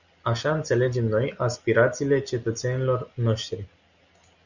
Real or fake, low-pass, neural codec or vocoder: real; 7.2 kHz; none